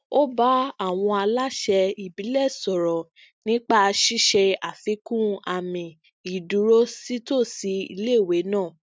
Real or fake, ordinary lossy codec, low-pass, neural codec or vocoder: real; none; none; none